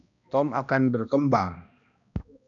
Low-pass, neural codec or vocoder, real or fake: 7.2 kHz; codec, 16 kHz, 1 kbps, X-Codec, HuBERT features, trained on balanced general audio; fake